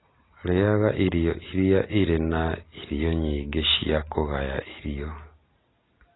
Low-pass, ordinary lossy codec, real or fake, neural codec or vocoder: 7.2 kHz; AAC, 16 kbps; real; none